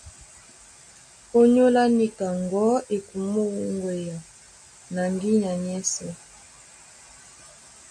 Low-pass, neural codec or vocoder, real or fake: 9.9 kHz; none; real